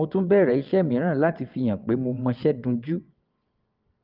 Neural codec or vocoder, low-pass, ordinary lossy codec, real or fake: vocoder, 22.05 kHz, 80 mel bands, WaveNeXt; 5.4 kHz; Opus, 32 kbps; fake